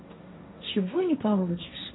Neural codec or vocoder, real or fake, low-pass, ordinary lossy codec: codec, 16 kHz, 1.1 kbps, Voila-Tokenizer; fake; 7.2 kHz; AAC, 16 kbps